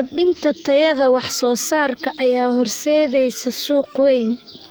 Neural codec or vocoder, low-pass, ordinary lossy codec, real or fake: codec, 44.1 kHz, 2.6 kbps, SNAC; none; none; fake